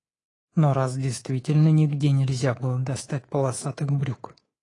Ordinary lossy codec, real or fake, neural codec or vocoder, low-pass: AAC, 32 kbps; fake; codec, 24 kHz, 3.1 kbps, DualCodec; 10.8 kHz